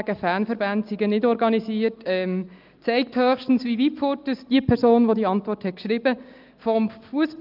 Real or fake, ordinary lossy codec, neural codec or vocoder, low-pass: real; Opus, 24 kbps; none; 5.4 kHz